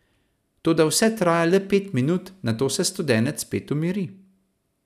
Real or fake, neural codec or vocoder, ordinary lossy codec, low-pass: real; none; none; 14.4 kHz